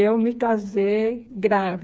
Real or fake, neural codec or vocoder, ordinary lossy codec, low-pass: fake; codec, 16 kHz, 4 kbps, FreqCodec, smaller model; none; none